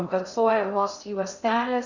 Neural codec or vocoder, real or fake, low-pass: codec, 16 kHz in and 24 kHz out, 0.6 kbps, FocalCodec, streaming, 2048 codes; fake; 7.2 kHz